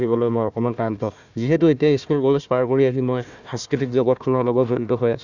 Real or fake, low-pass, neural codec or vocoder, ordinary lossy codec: fake; 7.2 kHz; codec, 16 kHz, 1 kbps, FunCodec, trained on Chinese and English, 50 frames a second; none